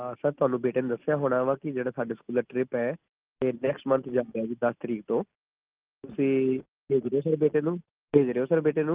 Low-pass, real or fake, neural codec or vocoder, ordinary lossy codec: 3.6 kHz; real; none; Opus, 32 kbps